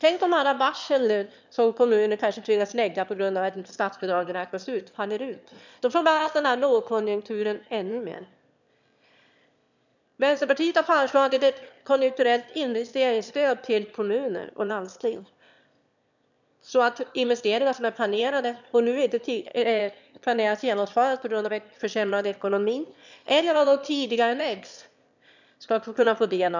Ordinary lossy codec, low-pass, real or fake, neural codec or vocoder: none; 7.2 kHz; fake; autoencoder, 22.05 kHz, a latent of 192 numbers a frame, VITS, trained on one speaker